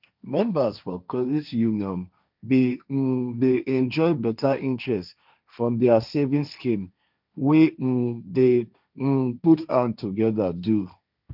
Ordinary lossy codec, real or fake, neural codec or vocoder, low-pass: none; fake; codec, 16 kHz, 1.1 kbps, Voila-Tokenizer; 5.4 kHz